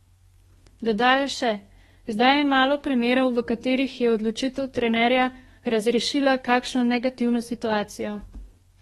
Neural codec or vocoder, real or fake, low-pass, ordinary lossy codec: codec, 32 kHz, 1.9 kbps, SNAC; fake; 14.4 kHz; AAC, 32 kbps